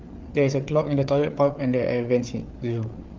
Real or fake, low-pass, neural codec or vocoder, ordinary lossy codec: fake; 7.2 kHz; codec, 16 kHz, 8 kbps, FreqCodec, larger model; Opus, 24 kbps